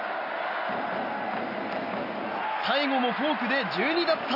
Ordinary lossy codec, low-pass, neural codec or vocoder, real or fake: none; 5.4 kHz; none; real